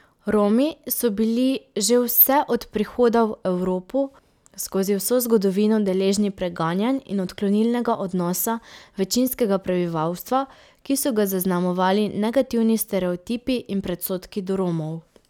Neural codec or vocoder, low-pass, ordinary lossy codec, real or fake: none; 19.8 kHz; none; real